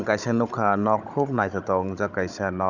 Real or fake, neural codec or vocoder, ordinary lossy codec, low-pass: fake; codec, 16 kHz, 16 kbps, FunCodec, trained on Chinese and English, 50 frames a second; none; 7.2 kHz